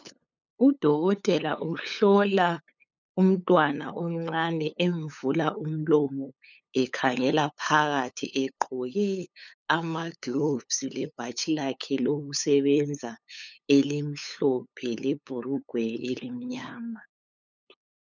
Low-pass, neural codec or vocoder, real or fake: 7.2 kHz; codec, 16 kHz, 8 kbps, FunCodec, trained on LibriTTS, 25 frames a second; fake